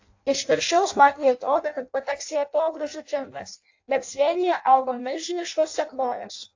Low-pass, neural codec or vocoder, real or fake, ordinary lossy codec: 7.2 kHz; codec, 16 kHz in and 24 kHz out, 0.6 kbps, FireRedTTS-2 codec; fake; AAC, 48 kbps